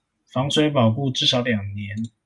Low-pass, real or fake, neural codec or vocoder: 10.8 kHz; real; none